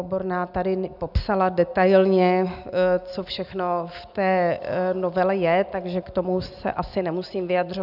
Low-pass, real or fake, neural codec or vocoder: 5.4 kHz; real; none